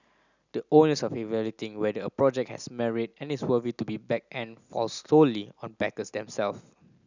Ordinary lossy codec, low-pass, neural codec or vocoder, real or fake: none; 7.2 kHz; none; real